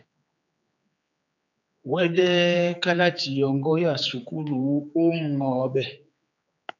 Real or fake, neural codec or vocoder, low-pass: fake; codec, 16 kHz, 4 kbps, X-Codec, HuBERT features, trained on general audio; 7.2 kHz